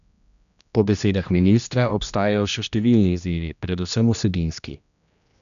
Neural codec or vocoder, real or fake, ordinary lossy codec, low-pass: codec, 16 kHz, 1 kbps, X-Codec, HuBERT features, trained on general audio; fake; none; 7.2 kHz